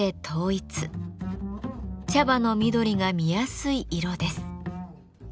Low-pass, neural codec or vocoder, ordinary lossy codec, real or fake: none; none; none; real